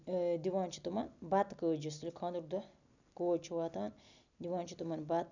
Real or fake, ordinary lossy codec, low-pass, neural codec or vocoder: real; none; 7.2 kHz; none